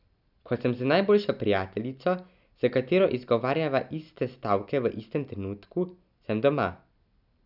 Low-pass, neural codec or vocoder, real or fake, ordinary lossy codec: 5.4 kHz; none; real; none